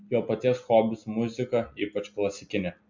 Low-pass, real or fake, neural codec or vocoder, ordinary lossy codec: 7.2 kHz; real; none; MP3, 64 kbps